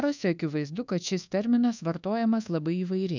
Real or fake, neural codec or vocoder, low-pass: fake; codec, 24 kHz, 1.2 kbps, DualCodec; 7.2 kHz